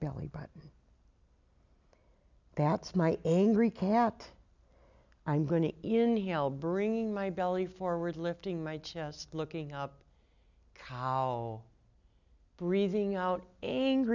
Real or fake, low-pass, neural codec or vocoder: real; 7.2 kHz; none